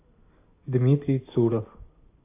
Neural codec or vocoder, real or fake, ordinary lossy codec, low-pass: none; real; AAC, 24 kbps; 3.6 kHz